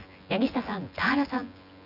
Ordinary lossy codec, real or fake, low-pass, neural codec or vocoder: none; fake; 5.4 kHz; vocoder, 24 kHz, 100 mel bands, Vocos